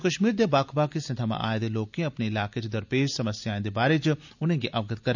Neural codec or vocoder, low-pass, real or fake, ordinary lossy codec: none; 7.2 kHz; real; none